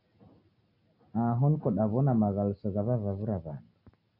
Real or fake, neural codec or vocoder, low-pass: real; none; 5.4 kHz